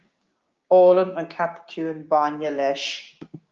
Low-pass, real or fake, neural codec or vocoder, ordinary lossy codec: 7.2 kHz; fake; codec, 16 kHz, 6 kbps, DAC; Opus, 32 kbps